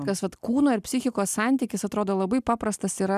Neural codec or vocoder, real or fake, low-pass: none; real; 14.4 kHz